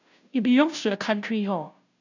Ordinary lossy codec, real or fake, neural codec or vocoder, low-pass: none; fake; codec, 16 kHz, 0.5 kbps, FunCodec, trained on Chinese and English, 25 frames a second; 7.2 kHz